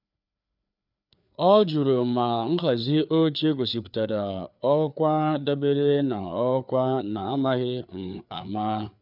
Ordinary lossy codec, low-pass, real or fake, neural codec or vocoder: none; 5.4 kHz; fake; codec, 16 kHz, 4 kbps, FreqCodec, larger model